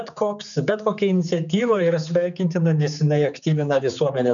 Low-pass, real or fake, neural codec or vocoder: 7.2 kHz; fake; codec, 16 kHz, 4 kbps, X-Codec, HuBERT features, trained on general audio